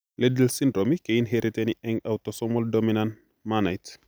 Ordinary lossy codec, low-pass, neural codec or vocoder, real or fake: none; none; none; real